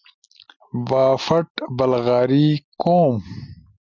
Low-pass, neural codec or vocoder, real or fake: 7.2 kHz; none; real